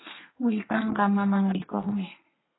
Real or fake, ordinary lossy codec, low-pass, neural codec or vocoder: fake; AAC, 16 kbps; 7.2 kHz; codec, 32 kHz, 1.9 kbps, SNAC